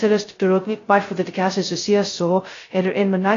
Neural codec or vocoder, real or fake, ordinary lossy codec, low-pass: codec, 16 kHz, 0.2 kbps, FocalCodec; fake; AAC, 32 kbps; 7.2 kHz